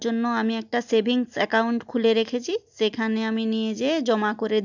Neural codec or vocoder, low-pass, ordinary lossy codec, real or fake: none; 7.2 kHz; none; real